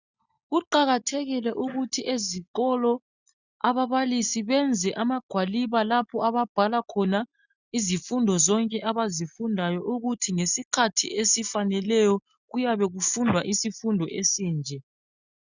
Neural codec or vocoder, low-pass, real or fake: none; 7.2 kHz; real